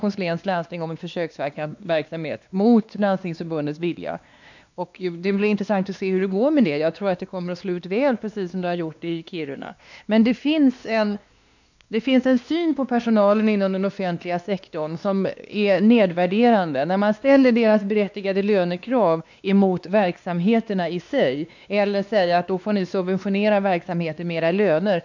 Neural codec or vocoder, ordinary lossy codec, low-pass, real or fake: codec, 16 kHz, 2 kbps, X-Codec, WavLM features, trained on Multilingual LibriSpeech; none; 7.2 kHz; fake